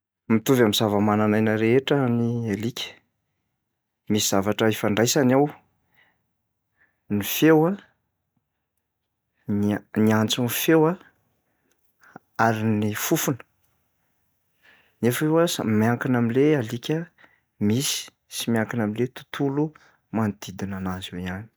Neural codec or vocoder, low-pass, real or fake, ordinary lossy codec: none; none; real; none